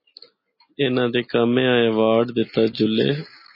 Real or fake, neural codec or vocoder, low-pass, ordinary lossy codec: real; none; 5.4 kHz; MP3, 24 kbps